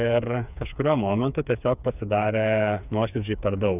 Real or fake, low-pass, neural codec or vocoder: fake; 3.6 kHz; codec, 16 kHz, 4 kbps, FreqCodec, smaller model